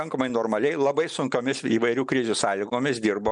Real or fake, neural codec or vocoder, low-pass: real; none; 9.9 kHz